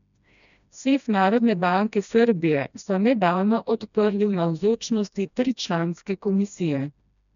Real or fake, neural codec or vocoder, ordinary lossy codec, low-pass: fake; codec, 16 kHz, 1 kbps, FreqCodec, smaller model; none; 7.2 kHz